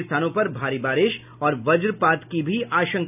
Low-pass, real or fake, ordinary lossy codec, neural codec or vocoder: 3.6 kHz; real; none; none